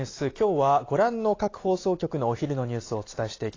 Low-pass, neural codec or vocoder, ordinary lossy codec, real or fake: 7.2 kHz; none; AAC, 32 kbps; real